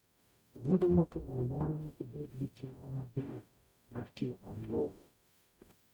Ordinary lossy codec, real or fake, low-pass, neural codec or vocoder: none; fake; none; codec, 44.1 kHz, 0.9 kbps, DAC